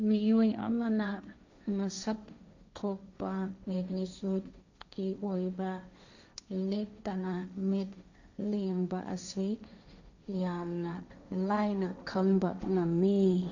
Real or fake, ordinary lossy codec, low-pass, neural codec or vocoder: fake; none; 7.2 kHz; codec, 16 kHz, 1.1 kbps, Voila-Tokenizer